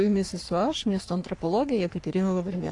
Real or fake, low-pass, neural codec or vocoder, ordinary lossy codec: fake; 10.8 kHz; codec, 44.1 kHz, 3.4 kbps, Pupu-Codec; AAC, 48 kbps